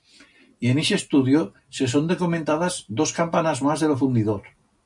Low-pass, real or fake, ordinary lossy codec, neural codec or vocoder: 10.8 kHz; real; MP3, 96 kbps; none